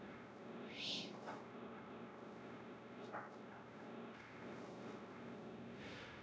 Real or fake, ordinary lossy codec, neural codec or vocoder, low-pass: fake; none; codec, 16 kHz, 0.5 kbps, X-Codec, WavLM features, trained on Multilingual LibriSpeech; none